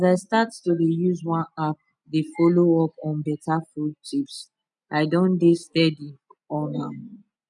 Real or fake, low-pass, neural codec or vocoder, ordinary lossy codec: real; 10.8 kHz; none; none